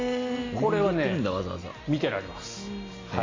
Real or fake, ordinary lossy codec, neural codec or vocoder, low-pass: real; AAC, 32 kbps; none; 7.2 kHz